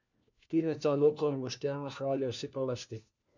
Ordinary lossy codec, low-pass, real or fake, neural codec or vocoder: none; 7.2 kHz; fake; codec, 16 kHz, 1 kbps, FunCodec, trained on LibriTTS, 50 frames a second